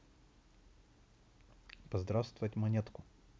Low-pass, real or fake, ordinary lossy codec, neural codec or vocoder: none; real; none; none